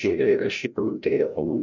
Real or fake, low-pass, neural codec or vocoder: fake; 7.2 kHz; codec, 16 kHz, 0.5 kbps, FreqCodec, larger model